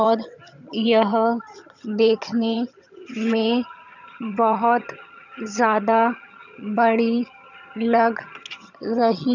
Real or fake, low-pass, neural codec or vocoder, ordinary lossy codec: fake; 7.2 kHz; vocoder, 22.05 kHz, 80 mel bands, HiFi-GAN; none